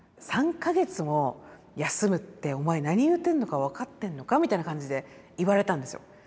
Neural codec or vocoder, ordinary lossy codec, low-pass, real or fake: none; none; none; real